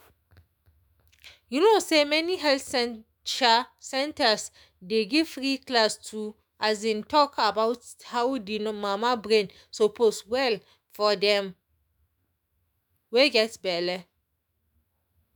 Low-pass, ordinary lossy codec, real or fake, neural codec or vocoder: none; none; fake; autoencoder, 48 kHz, 128 numbers a frame, DAC-VAE, trained on Japanese speech